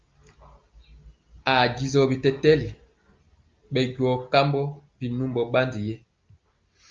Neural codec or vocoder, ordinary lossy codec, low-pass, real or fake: none; Opus, 24 kbps; 7.2 kHz; real